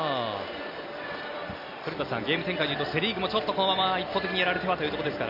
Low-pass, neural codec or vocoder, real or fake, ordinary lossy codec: 5.4 kHz; none; real; none